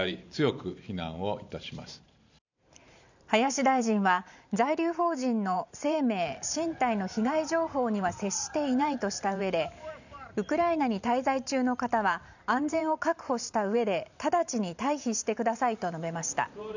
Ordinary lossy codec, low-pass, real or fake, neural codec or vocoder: none; 7.2 kHz; fake; vocoder, 44.1 kHz, 128 mel bands every 512 samples, BigVGAN v2